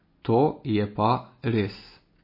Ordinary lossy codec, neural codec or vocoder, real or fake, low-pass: MP3, 24 kbps; none; real; 5.4 kHz